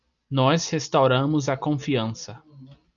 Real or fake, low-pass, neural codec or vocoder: real; 7.2 kHz; none